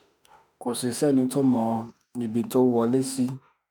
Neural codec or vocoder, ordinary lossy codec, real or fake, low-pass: autoencoder, 48 kHz, 32 numbers a frame, DAC-VAE, trained on Japanese speech; none; fake; none